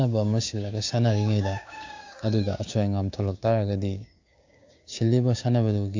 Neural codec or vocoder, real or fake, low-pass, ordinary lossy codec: codec, 16 kHz, 6 kbps, DAC; fake; 7.2 kHz; AAC, 48 kbps